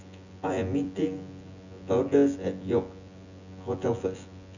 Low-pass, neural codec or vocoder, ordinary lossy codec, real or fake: 7.2 kHz; vocoder, 24 kHz, 100 mel bands, Vocos; none; fake